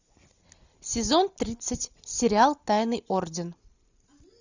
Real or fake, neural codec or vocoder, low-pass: real; none; 7.2 kHz